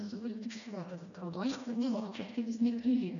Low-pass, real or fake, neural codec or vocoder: 7.2 kHz; fake; codec, 16 kHz, 1 kbps, FreqCodec, smaller model